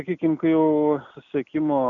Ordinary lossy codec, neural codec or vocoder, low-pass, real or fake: MP3, 96 kbps; none; 7.2 kHz; real